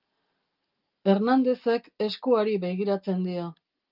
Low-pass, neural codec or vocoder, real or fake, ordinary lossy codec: 5.4 kHz; none; real; Opus, 24 kbps